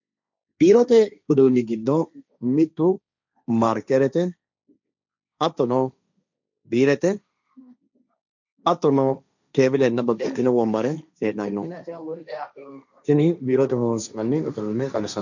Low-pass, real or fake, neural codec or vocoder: 7.2 kHz; fake; codec, 16 kHz, 1.1 kbps, Voila-Tokenizer